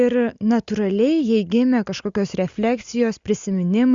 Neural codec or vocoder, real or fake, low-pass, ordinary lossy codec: none; real; 7.2 kHz; Opus, 64 kbps